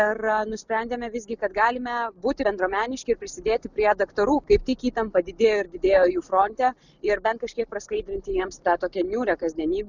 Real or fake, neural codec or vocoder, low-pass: real; none; 7.2 kHz